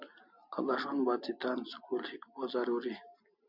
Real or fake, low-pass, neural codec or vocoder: real; 5.4 kHz; none